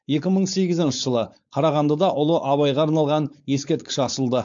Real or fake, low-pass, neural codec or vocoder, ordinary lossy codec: fake; 7.2 kHz; codec, 16 kHz, 4.8 kbps, FACodec; AAC, 64 kbps